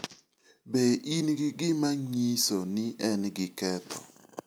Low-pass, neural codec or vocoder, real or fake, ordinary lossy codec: none; none; real; none